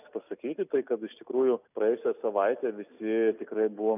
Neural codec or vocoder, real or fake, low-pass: none; real; 3.6 kHz